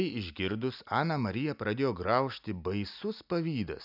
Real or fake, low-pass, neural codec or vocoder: real; 5.4 kHz; none